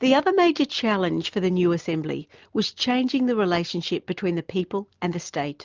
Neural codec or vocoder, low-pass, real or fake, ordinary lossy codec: none; 7.2 kHz; real; Opus, 32 kbps